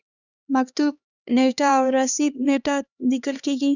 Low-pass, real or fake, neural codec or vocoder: 7.2 kHz; fake; codec, 16 kHz, 2 kbps, X-Codec, HuBERT features, trained on LibriSpeech